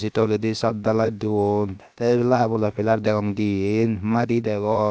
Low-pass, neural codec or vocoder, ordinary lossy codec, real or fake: none; codec, 16 kHz, about 1 kbps, DyCAST, with the encoder's durations; none; fake